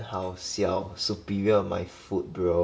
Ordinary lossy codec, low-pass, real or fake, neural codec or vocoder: none; none; real; none